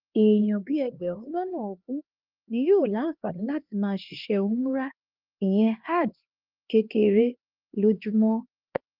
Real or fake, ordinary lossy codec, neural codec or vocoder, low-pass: fake; Opus, 24 kbps; codec, 16 kHz, 2 kbps, X-Codec, HuBERT features, trained on LibriSpeech; 5.4 kHz